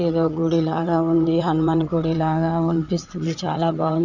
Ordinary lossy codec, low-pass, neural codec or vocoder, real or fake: none; 7.2 kHz; vocoder, 22.05 kHz, 80 mel bands, WaveNeXt; fake